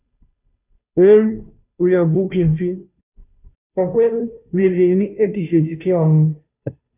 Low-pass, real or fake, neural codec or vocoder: 3.6 kHz; fake; codec, 16 kHz, 0.5 kbps, FunCodec, trained on Chinese and English, 25 frames a second